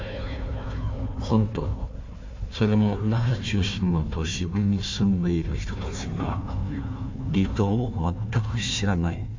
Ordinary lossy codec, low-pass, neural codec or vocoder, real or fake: MP3, 48 kbps; 7.2 kHz; codec, 16 kHz, 1 kbps, FunCodec, trained on Chinese and English, 50 frames a second; fake